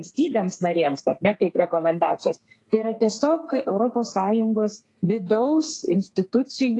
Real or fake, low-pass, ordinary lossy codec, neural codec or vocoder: fake; 10.8 kHz; AAC, 48 kbps; codec, 32 kHz, 1.9 kbps, SNAC